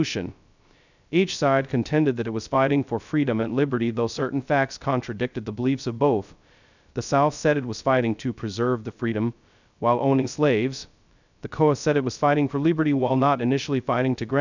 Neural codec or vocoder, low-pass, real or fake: codec, 16 kHz, 0.2 kbps, FocalCodec; 7.2 kHz; fake